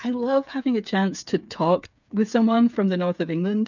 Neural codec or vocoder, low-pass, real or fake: codec, 16 kHz, 8 kbps, FreqCodec, smaller model; 7.2 kHz; fake